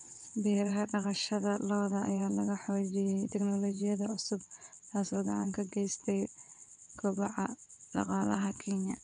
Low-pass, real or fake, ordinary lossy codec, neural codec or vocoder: 9.9 kHz; fake; MP3, 96 kbps; vocoder, 22.05 kHz, 80 mel bands, WaveNeXt